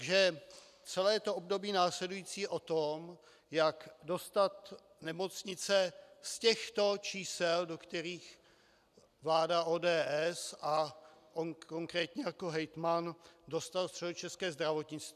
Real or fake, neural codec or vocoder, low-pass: real; none; 14.4 kHz